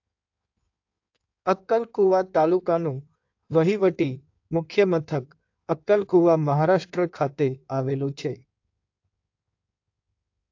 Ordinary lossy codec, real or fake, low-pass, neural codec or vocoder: AAC, 48 kbps; fake; 7.2 kHz; codec, 16 kHz in and 24 kHz out, 1.1 kbps, FireRedTTS-2 codec